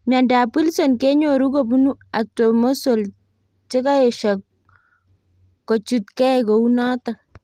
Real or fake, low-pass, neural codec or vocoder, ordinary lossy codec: real; 14.4 kHz; none; Opus, 24 kbps